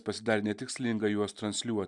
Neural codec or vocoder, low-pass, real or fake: none; 10.8 kHz; real